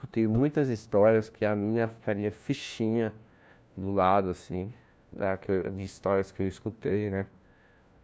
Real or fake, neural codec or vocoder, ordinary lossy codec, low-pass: fake; codec, 16 kHz, 1 kbps, FunCodec, trained on LibriTTS, 50 frames a second; none; none